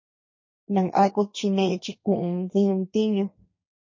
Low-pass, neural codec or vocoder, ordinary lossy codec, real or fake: 7.2 kHz; codec, 32 kHz, 1.9 kbps, SNAC; MP3, 32 kbps; fake